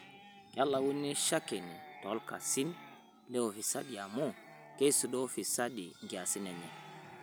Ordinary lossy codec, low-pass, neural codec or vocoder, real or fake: none; none; none; real